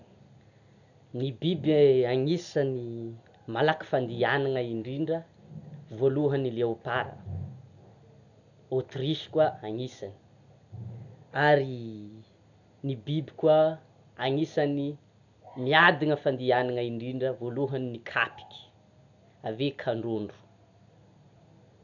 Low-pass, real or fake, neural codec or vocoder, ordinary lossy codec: 7.2 kHz; real; none; none